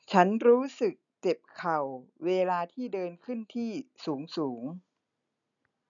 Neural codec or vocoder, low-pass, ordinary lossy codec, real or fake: none; 7.2 kHz; none; real